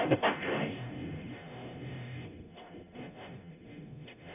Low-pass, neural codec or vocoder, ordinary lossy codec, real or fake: 3.6 kHz; codec, 44.1 kHz, 0.9 kbps, DAC; none; fake